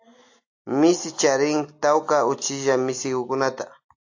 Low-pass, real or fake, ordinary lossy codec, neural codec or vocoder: 7.2 kHz; real; AAC, 48 kbps; none